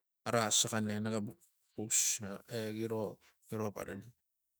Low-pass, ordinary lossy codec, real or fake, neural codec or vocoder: none; none; fake; autoencoder, 48 kHz, 128 numbers a frame, DAC-VAE, trained on Japanese speech